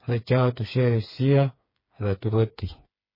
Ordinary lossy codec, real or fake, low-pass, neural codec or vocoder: MP3, 24 kbps; fake; 5.4 kHz; codec, 16 kHz, 4 kbps, FreqCodec, smaller model